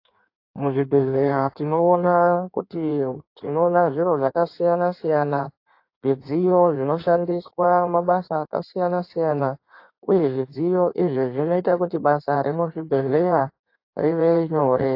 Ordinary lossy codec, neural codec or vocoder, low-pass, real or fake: AAC, 32 kbps; codec, 16 kHz in and 24 kHz out, 1.1 kbps, FireRedTTS-2 codec; 5.4 kHz; fake